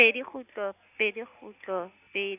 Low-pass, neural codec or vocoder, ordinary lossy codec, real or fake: 3.6 kHz; none; none; real